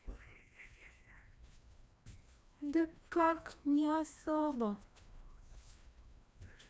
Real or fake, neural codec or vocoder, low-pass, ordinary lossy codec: fake; codec, 16 kHz, 1 kbps, FreqCodec, larger model; none; none